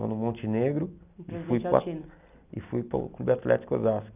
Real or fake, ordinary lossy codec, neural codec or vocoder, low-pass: real; none; none; 3.6 kHz